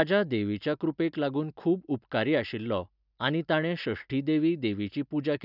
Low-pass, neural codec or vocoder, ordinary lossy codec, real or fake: 5.4 kHz; none; none; real